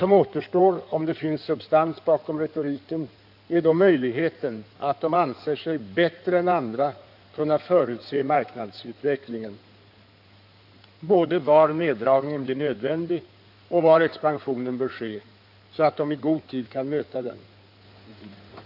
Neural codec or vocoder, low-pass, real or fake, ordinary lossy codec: codec, 16 kHz in and 24 kHz out, 2.2 kbps, FireRedTTS-2 codec; 5.4 kHz; fake; none